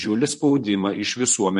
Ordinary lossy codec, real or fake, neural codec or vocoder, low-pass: MP3, 48 kbps; fake; vocoder, 44.1 kHz, 128 mel bands, Pupu-Vocoder; 14.4 kHz